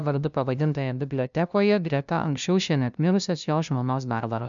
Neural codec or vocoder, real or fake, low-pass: codec, 16 kHz, 0.5 kbps, FunCodec, trained on LibriTTS, 25 frames a second; fake; 7.2 kHz